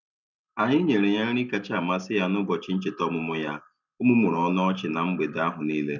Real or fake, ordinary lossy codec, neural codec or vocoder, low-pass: real; none; none; 7.2 kHz